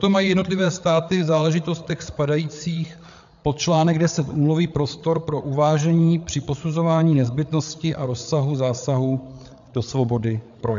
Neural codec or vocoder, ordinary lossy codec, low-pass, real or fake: codec, 16 kHz, 8 kbps, FreqCodec, larger model; MP3, 64 kbps; 7.2 kHz; fake